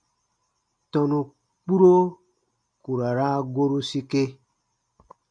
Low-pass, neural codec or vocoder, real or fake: 9.9 kHz; none; real